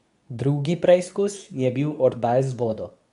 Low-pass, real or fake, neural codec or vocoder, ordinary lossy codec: 10.8 kHz; fake; codec, 24 kHz, 0.9 kbps, WavTokenizer, medium speech release version 2; none